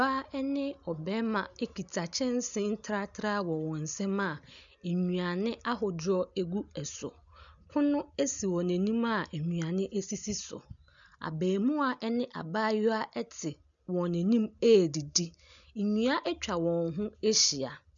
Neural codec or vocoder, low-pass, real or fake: none; 7.2 kHz; real